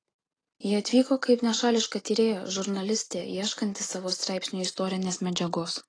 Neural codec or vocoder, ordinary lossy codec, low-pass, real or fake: none; AAC, 32 kbps; 9.9 kHz; real